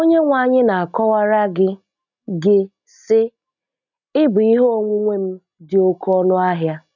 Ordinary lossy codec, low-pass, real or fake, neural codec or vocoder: none; 7.2 kHz; real; none